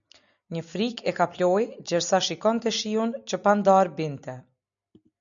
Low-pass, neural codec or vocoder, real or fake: 7.2 kHz; none; real